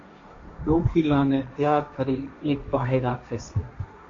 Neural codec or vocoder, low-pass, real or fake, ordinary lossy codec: codec, 16 kHz, 1.1 kbps, Voila-Tokenizer; 7.2 kHz; fake; MP3, 48 kbps